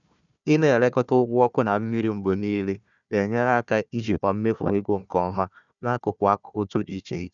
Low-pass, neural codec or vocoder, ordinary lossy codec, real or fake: 7.2 kHz; codec, 16 kHz, 1 kbps, FunCodec, trained on Chinese and English, 50 frames a second; none; fake